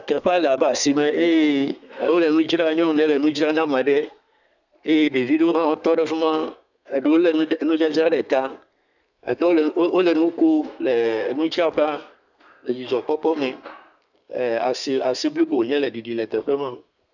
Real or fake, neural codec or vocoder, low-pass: fake; codec, 32 kHz, 1.9 kbps, SNAC; 7.2 kHz